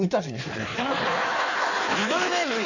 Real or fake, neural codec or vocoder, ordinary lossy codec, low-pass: fake; codec, 16 kHz in and 24 kHz out, 1.1 kbps, FireRedTTS-2 codec; none; 7.2 kHz